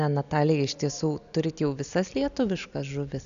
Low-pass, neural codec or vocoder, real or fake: 7.2 kHz; none; real